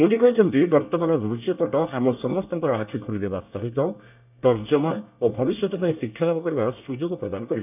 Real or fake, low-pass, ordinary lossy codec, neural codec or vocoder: fake; 3.6 kHz; none; codec, 24 kHz, 1 kbps, SNAC